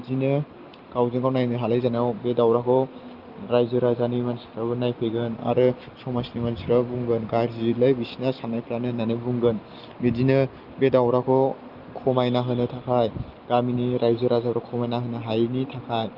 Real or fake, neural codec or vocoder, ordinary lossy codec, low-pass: real; none; Opus, 32 kbps; 5.4 kHz